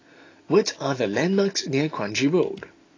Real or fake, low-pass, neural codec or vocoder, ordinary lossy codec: real; 7.2 kHz; none; AAC, 32 kbps